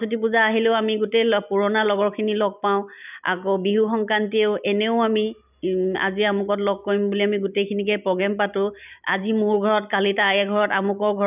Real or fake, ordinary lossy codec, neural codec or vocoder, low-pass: real; none; none; 3.6 kHz